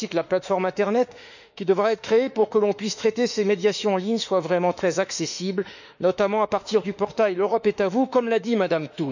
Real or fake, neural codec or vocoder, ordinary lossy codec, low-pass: fake; autoencoder, 48 kHz, 32 numbers a frame, DAC-VAE, trained on Japanese speech; none; 7.2 kHz